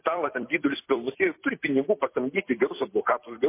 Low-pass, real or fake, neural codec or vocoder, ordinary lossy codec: 3.6 kHz; fake; vocoder, 44.1 kHz, 128 mel bands every 256 samples, BigVGAN v2; MP3, 24 kbps